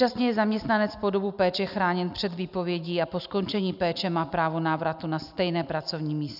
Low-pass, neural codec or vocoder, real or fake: 5.4 kHz; none; real